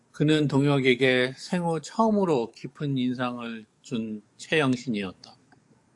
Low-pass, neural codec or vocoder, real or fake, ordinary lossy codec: 10.8 kHz; codec, 44.1 kHz, 7.8 kbps, DAC; fake; AAC, 64 kbps